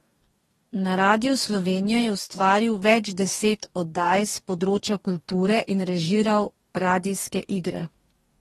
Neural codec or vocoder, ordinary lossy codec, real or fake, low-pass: codec, 44.1 kHz, 2.6 kbps, DAC; AAC, 32 kbps; fake; 19.8 kHz